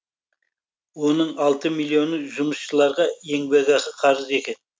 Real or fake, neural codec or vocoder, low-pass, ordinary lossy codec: real; none; none; none